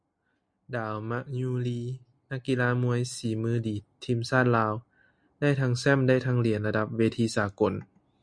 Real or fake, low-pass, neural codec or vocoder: real; 9.9 kHz; none